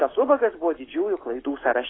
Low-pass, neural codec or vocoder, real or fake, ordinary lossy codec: 7.2 kHz; none; real; AAC, 16 kbps